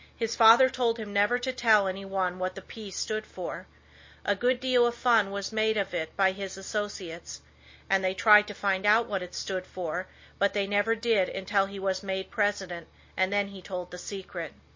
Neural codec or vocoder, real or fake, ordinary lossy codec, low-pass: none; real; MP3, 32 kbps; 7.2 kHz